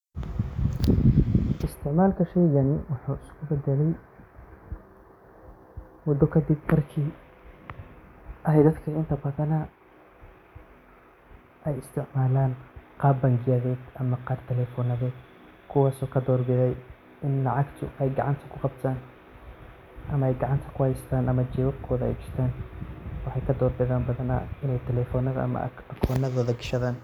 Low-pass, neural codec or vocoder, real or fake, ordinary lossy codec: 19.8 kHz; none; real; none